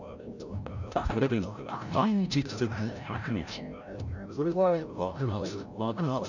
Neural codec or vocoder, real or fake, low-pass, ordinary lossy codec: codec, 16 kHz, 0.5 kbps, FreqCodec, larger model; fake; 7.2 kHz; none